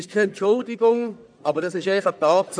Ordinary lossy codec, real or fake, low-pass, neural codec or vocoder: none; fake; 9.9 kHz; codec, 44.1 kHz, 1.7 kbps, Pupu-Codec